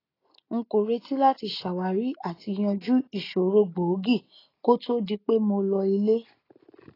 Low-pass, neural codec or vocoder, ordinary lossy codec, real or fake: 5.4 kHz; none; AAC, 24 kbps; real